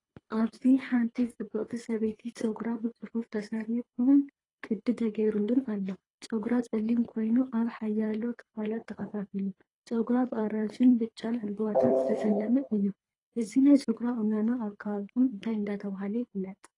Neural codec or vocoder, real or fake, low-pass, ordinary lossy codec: codec, 24 kHz, 3 kbps, HILCodec; fake; 10.8 kHz; AAC, 32 kbps